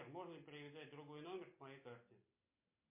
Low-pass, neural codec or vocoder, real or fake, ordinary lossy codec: 3.6 kHz; none; real; MP3, 24 kbps